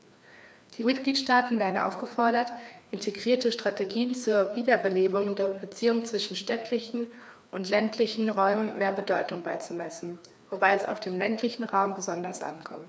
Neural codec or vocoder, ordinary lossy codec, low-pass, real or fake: codec, 16 kHz, 2 kbps, FreqCodec, larger model; none; none; fake